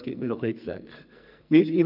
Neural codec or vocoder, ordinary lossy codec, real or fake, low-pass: codec, 44.1 kHz, 2.6 kbps, SNAC; none; fake; 5.4 kHz